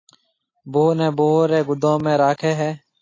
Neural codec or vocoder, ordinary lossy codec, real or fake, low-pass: none; AAC, 32 kbps; real; 7.2 kHz